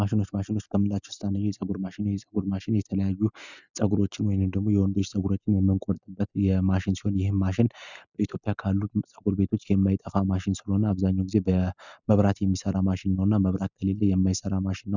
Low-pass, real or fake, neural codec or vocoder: 7.2 kHz; real; none